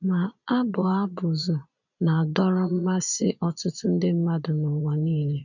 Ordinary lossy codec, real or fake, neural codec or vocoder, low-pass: none; fake; vocoder, 22.05 kHz, 80 mel bands, Vocos; 7.2 kHz